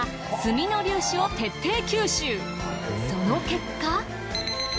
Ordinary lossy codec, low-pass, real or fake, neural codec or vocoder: none; none; real; none